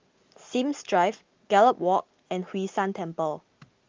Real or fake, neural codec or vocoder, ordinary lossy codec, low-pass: real; none; Opus, 32 kbps; 7.2 kHz